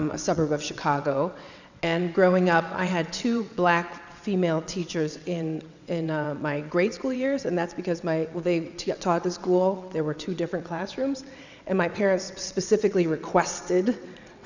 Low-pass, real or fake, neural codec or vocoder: 7.2 kHz; fake; vocoder, 22.05 kHz, 80 mel bands, WaveNeXt